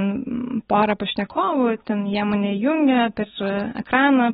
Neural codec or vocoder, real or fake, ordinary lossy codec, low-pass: none; real; AAC, 16 kbps; 7.2 kHz